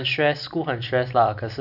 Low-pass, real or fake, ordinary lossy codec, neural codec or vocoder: 5.4 kHz; real; none; none